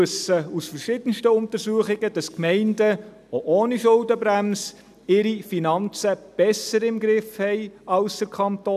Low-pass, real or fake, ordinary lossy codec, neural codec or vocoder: 14.4 kHz; real; none; none